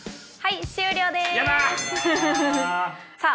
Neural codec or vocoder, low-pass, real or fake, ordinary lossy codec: none; none; real; none